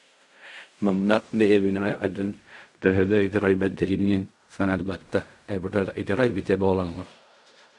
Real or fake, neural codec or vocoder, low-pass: fake; codec, 16 kHz in and 24 kHz out, 0.4 kbps, LongCat-Audio-Codec, fine tuned four codebook decoder; 10.8 kHz